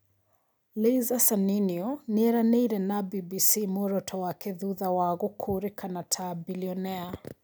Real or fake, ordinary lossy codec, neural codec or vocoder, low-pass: real; none; none; none